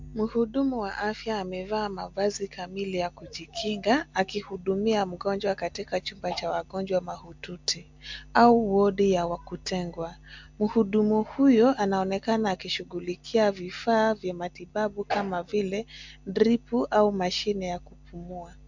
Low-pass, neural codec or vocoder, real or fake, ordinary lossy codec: 7.2 kHz; none; real; MP3, 64 kbps